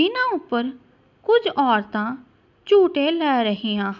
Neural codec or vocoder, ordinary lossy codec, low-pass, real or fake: none; none; 7.2 kHz; real